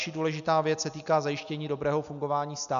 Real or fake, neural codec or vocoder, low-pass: real; none; 7.2 kHz